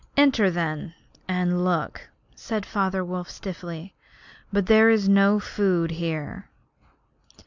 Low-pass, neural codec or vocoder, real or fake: 7.2 kHz; none; real